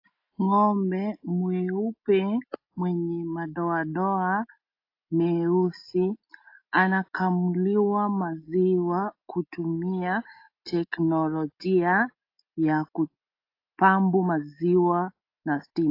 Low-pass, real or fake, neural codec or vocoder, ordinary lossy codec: 5.4 kHz; real; none; AAC, 32 kbps